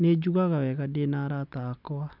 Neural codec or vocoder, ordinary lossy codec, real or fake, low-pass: none; none; real; 5.4 kHz